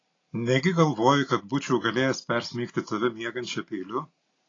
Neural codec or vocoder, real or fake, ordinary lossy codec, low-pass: none; real; AAC, 32 kbps; 7.2 kHz